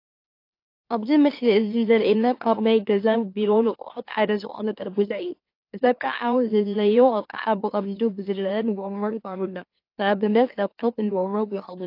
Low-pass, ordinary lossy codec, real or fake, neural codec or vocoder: 5.4 kHz; AAC, 32 kbps; fake; autoencoder, 44.1 kHz, a latent of 192 numbers a frame, MeloTTS